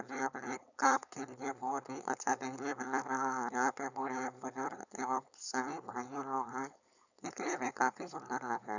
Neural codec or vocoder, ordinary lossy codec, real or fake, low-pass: codec, 16 kHz, 4.8 kbps, FACodec; none; fake; 7.2 kHz